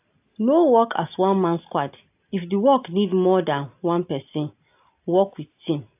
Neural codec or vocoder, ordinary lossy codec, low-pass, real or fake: none; AAC, 32 kbps; 3.6 kHz; real